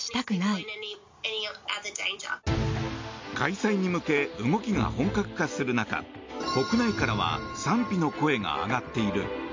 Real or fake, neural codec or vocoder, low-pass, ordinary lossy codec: real; none; 7.2 kHz; MP3, 48 kbps